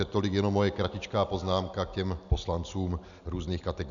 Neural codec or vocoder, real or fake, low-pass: none; real; 7.2 kHz